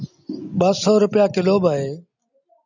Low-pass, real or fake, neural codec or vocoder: 7.2 kHz; real; none